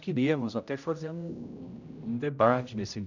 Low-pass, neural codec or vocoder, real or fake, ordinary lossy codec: 7.2 kHz; codec, 16 kHz, 0.5 kbps, X-Codec, HuBERT features, trained on general audio; fake; none